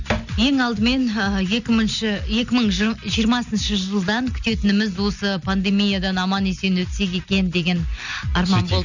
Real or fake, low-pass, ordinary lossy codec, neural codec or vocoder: real; 7.2 kHz; none; none